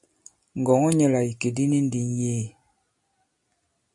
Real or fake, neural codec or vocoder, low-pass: real; none; 10.8 kHz